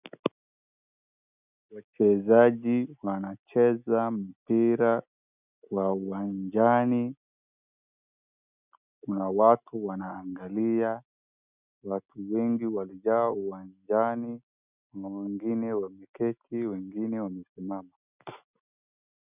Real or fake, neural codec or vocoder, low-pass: real; none; 3.6 kHz